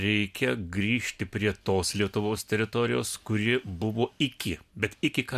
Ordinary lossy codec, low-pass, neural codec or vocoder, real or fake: MP3, 96 kbps; 14.4 kHz; none; real